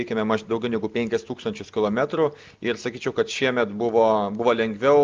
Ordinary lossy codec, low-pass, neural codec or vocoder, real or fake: Opus, 32 kbps; 7.2 kHz; none; real